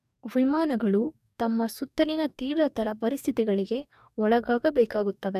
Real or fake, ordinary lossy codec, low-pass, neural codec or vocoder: fake; none; 14.4 kHz; codec, 44.1 kHz, 2.6 kbps, DAC